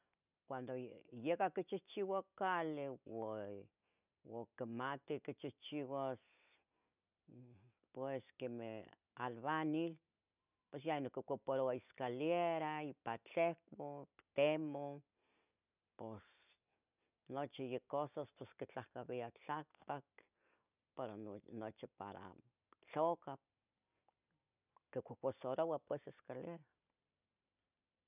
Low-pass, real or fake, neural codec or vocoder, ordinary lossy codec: 3.6 kHz; real; none; none